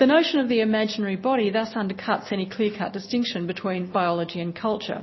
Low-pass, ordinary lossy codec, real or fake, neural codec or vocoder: 7.2 kHz; MP3, 24 kbps; real; none